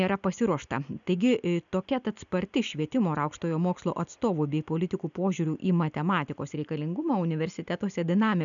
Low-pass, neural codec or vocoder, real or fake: 7.2 kHz; none; real